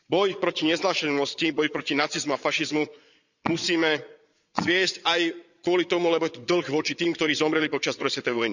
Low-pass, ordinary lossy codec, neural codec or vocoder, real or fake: 7.2 kHz; none; vocoder, 22.05 kHz, 80 mel bands, Vocos; fake